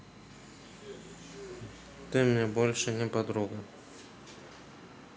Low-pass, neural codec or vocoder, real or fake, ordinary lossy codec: none; none; real; none